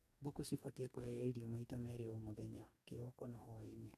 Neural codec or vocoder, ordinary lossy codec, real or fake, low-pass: codec, 44.1 kHz, 2.6 kbps, DAC; AAC, 64 kbps; fake; 14.4 kHz